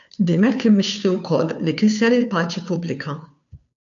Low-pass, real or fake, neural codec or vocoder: 7.2 kHz; fake; codec, 16 kHz, 2 kbps, FunCodec, trained on Chinese and English, 25 frames a second